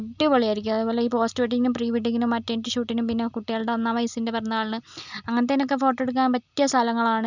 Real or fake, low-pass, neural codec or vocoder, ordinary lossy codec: real; 7.2 kHz; none; none